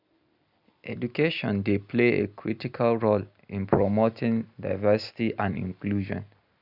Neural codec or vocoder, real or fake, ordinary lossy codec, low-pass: none; real; none; 5.4 kHz